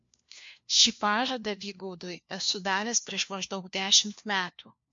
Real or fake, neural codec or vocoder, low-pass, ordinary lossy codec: fake; codec, 16 kHz, 1 kbps, FunCodec, trained on LibriTTS, 50 frames a second; 7.2 kHz; MP3, 48 kbps